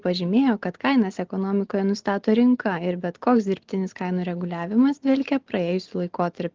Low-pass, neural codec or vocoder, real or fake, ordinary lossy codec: 7.2 kHz; none; real; Opus, 16 kbps